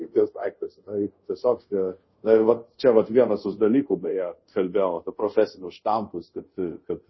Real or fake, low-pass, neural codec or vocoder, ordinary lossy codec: fake; 7.2 kHz; codec, 24 kHz, 0.5 kbps, DualCodec; MP3, 24 kbps